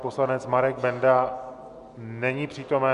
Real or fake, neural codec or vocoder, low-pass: real; none; 10.8 kHz